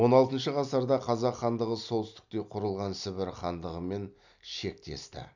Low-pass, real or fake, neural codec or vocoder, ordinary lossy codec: 7.2 kHz; real; none; none